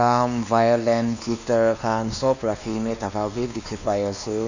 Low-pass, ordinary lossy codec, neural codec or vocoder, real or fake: 7.2 kHz; none; codec, 16 kHz, 2 kbps, X-Codec, WavLM features, trained on Multilingual LibriSpeech; fake